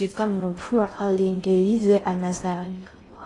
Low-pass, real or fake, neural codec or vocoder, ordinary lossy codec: 10.8 kHz; fake; codec, 16 kHz in and 24 kHz out, 0.6 kbps, FocalCodec, streaming, 4096 codes; AAC, 32 kbps